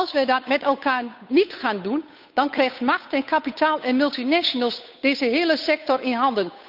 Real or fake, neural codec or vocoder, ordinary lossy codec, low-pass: fake; codec, 16 kHz, 8 kbps, FunCodec, trained on Chinese and English, 25 frames a second; none; 5.4 kHz